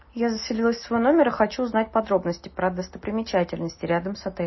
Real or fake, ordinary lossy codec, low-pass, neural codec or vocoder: real; MP3, 24 kbps; 7.2 kHz; none